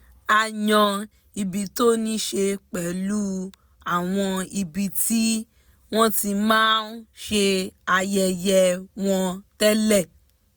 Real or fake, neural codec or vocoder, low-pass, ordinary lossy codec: real; none; none; none